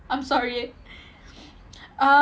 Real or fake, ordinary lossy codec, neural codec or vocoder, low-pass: real; none; none; none